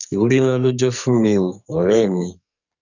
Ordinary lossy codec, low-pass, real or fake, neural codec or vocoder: none; 7.2 kHz; fake; codec, 44.1 kHz, 2.6 kbps, SNAC